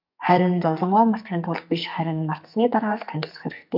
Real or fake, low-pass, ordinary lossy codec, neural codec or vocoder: fake; 5.4 kHz; MP3, 48 kbps; codec, 44.1 kHz, 2.6 kbps, SNAC